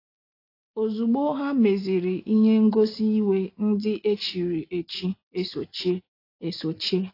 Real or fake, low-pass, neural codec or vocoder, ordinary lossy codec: real; 5.4 kHz; none; AAC, 32 kbps